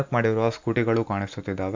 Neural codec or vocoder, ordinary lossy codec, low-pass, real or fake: none; MP3, 64 kbps; 7.2 kHz; real